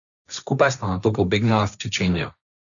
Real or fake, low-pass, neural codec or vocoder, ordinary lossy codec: fake; 7.2 kHz; codec, 16 kHz, 1.1 kbps, Voila-Tokenizer; none